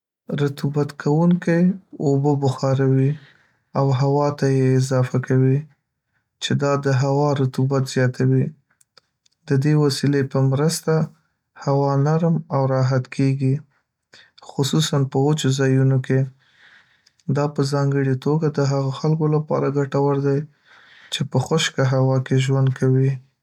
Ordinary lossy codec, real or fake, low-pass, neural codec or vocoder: none; real; 19.8 kHz; none